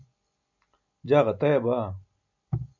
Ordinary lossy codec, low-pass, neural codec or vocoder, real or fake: MP3, 64 kbps; 7.2 kHz; none; real